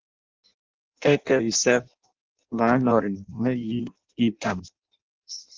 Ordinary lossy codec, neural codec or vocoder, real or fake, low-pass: Opus, 32 kbps; codec, 16 kHz in and 24 kHz out, 0.6 kbps, FireRedTTS-2 codec; fake; 7.2 kHz